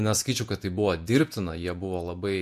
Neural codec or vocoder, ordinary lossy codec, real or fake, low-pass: vocoder, 48 kHz, 128 mel bands, Vocos; MP3, 64 kbps; fake; 14.4 kHz